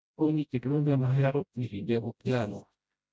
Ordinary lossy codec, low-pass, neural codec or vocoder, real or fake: none; none; codec, 16 kHz, 0.5 kbps, FreqCodec, smaller model; fake